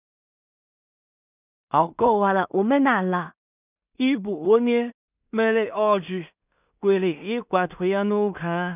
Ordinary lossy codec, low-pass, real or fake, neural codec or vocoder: none; 3.6 kHz; fake; codec, 16 kHz in and 24 kHz out, 0.4 kbps, LongCat-Audio-Codec, two codebook decoder